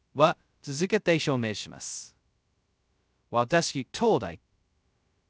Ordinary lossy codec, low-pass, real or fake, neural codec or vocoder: none; none; fake; codec, 16 kHz, 0.2 kbps, FocalCodec